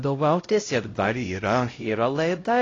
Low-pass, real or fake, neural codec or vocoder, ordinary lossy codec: 7.2 kHz; fake; codec, 16 kHz, 0.5 kbps, X-Codec, HuBERT features, trained on LibriSpeech; AAC, 32 kbps